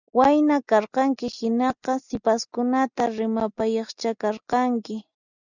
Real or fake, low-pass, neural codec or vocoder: real; 7.2 kHz; none